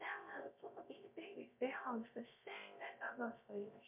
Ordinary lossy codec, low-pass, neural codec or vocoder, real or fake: MP3, 32 kbps; 3.6 kHz; codec, 16 kHz, 0.3 kbps, FocalCodec; fake